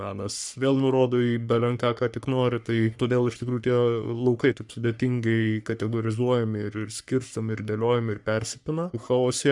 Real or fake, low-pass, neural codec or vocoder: fake; 10.8 kHz; codec, 44.1 kHz, 3.4 kbps, Pupu-Codec